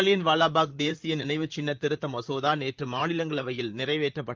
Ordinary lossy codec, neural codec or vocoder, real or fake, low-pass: Opus, 32 kbps; vocoder, 44.1 kHz, 128 mel bands, Pupu-Vocoder; fake; 7.2 kHz